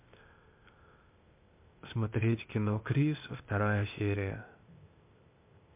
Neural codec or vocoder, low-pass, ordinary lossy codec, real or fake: codec, 16 kHz, 0.8 kbps, ZipCodec; 3.6 kHz; MP3, 32 kbps; fake